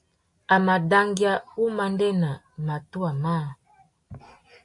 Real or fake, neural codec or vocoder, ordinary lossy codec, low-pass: real; none; AAC, 64 kbps; 10.8 kHz